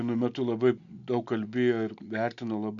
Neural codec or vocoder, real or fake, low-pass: none; real; 7.2 kHz